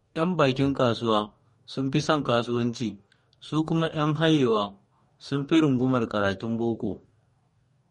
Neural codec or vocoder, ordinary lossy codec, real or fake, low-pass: codec, 44.1 kHz, 2.6 kbps, DAC; MP3, 48 kbps; fake; 19.8 kHz